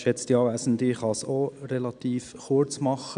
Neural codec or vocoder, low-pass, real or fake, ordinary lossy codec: none; 9.9 kHz; real; none